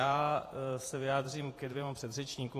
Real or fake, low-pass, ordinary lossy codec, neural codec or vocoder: fake; 14.4 kHz; AAC, 48 kbps; vocoder, 48 kHz, 128 mel bands, Vocos